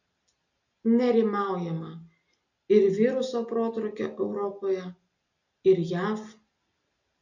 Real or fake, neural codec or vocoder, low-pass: real; none; 7.2 kHz